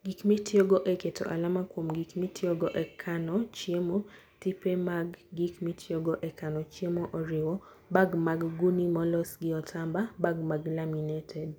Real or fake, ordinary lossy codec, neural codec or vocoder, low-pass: real; none; none; none